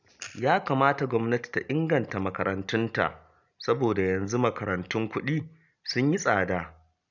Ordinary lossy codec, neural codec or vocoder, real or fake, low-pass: none; none; real; 7.2 kHz